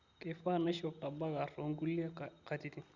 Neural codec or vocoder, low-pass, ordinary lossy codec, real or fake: none; 7.2 kHz; Opus, 64 kbps; real